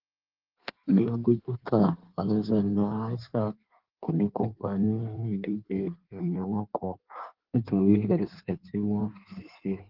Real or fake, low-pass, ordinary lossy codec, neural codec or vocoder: fake; 5.4 kHz; Opus, 16 kbps; codec, 16 kHz in and 24 kHz out, 1.1 kbps, FireRedTTS-2 codec